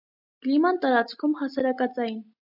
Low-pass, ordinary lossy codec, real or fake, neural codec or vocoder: 5.4 kHz; AAC, 48 kbps; real; none